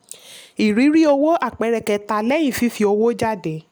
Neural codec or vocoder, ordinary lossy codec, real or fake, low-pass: none; none; real; none